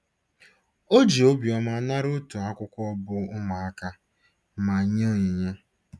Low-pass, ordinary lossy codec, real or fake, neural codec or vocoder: none; none; real; none